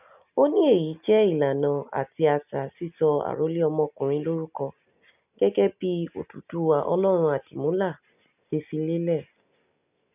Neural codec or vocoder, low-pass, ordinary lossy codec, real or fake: none; 3.6 kHz; none; real